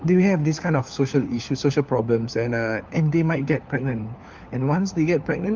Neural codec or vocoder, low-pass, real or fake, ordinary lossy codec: codec, 16 kHz, 4 kbps, FunCodec, trained on LibriTTS, 50 frames a second; 7.2 kHz; fake; Opus, 24 kbps